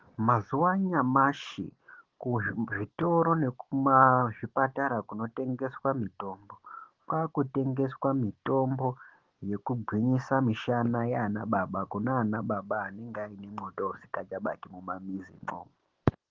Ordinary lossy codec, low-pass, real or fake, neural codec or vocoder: Opus, 16 kbps; 7.2 kHz; real; none